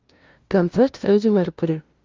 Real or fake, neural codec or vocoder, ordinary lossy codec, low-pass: fake; codec, 16 kHz, 0.5 kbps, FunCodec, trained on LibriTTS, 25 frames a second; Opus, 32 kbps; 7.2 kHz